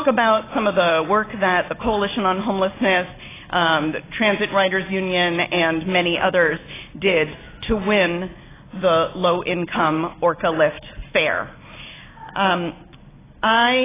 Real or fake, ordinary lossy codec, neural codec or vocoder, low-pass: real; AAC, 16 kbps; none; 3.6 kHz